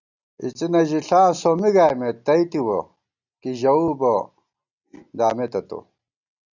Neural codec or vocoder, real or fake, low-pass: none; real; 7.2 kHz